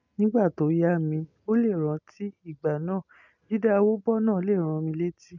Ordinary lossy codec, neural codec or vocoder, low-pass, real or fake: none; none; 7.2 kHz; real